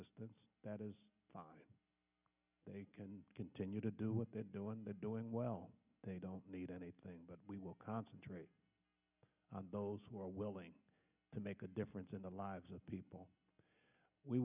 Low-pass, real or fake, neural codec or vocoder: 3.6 kHz; real; none